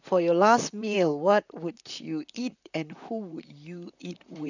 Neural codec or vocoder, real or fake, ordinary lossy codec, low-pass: vocoder, 44.1 kHz, 128 mel bands, Pupu-Vocoder; fake; none; 7.2 kHz